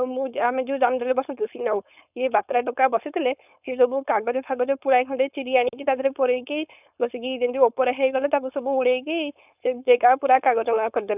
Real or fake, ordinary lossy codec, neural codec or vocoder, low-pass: fake; none; codec, 16 kHz, 4.8 kbps, FACodec; 3.6 kHz